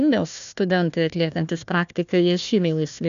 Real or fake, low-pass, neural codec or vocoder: fake; 7.2 kHz; codec, 16 kHz, 1 kbps, FunCodec, trained on Chinese and English, 50 frames a second